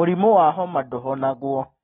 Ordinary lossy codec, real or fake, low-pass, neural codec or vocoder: AAC, 16 kbps; real; 19.8 kHz; none